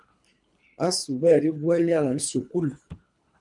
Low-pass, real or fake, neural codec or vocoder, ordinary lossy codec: 10.8 kHz; fake; codec, 24 kHz, 3 kbps, HILCodec; MP3, 96 kbps